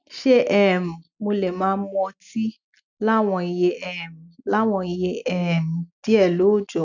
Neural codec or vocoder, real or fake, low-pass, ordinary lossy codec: none; real; 7.2 kHz; none